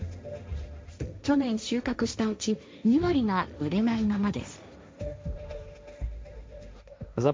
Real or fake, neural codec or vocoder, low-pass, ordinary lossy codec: fake; codec, 16 kHz, 1.1 kbps, Voila-Tokenizer; none; none